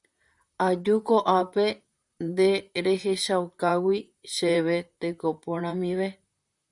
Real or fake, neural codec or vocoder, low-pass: fake; vocoder, 44.1 kHz, 128 mel bands, Pupu-Vocoder; 10.8 kHz